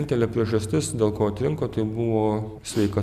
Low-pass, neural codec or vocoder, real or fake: 14.4 kHz; none; real